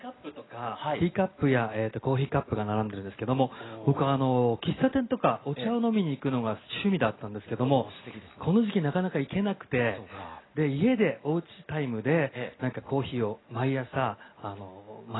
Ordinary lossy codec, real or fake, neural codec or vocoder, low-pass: AAC, 16 kbps; real; none; 7.2 kHz